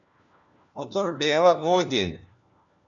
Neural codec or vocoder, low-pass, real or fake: codec, 16 kHz, 1 kbps, FunCodec, trained on LibriTTS, 50 frames a second; 7.2 kHz; fake